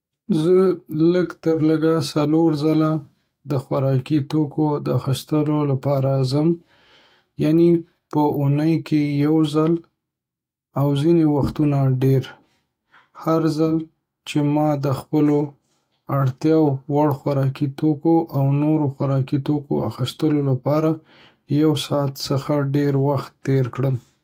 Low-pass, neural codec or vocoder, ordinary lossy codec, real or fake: 19.8 kHz; autoencoder, 48 kHz, 128 numbers a frame, DAC-VAE, trained on Japanese speech; AAC, 48 kbps; fake